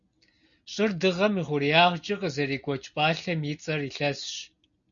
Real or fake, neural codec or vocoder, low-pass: real; none; 7.2 kHz